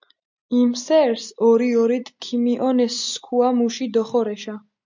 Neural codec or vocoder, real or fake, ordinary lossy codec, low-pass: none; real; MP3, 64 kbps; 7.2 kHz